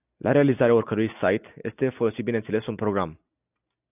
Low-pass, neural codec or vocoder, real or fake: 3.6 kHz; none; real